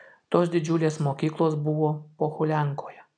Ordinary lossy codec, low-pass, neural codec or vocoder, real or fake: MP3, 96 kbps; 9.9 kHz; none; real